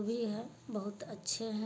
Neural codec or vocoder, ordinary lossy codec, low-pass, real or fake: none; none; none; real